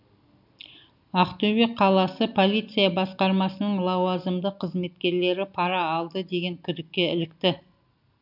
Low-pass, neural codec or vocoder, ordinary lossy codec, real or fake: 5.4 kHz; none; none; real